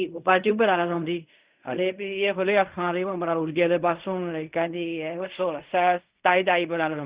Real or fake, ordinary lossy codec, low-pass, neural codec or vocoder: fake; Opus, 64 kbps; 3.6 kHz; codec, 16 kHz in and 24 kHz out, 0.4 kbps, LongCat-Audio-Codec, fine tuned four codebook decoder